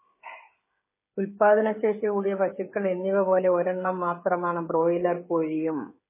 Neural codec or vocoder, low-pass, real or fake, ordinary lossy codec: codec, 16 kHz, 16 kbps, FreqCodec, smaller model; 3.6 kHz; fake; MP3, 16 kbps